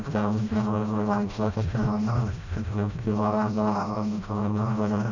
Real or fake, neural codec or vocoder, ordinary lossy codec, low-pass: fake; codec, 16 kHz, 0.5 kbps, FreqCodec, smaller model; none; 7.2 kHz